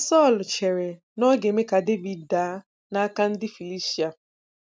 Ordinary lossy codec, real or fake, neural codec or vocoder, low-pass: none; real; none; none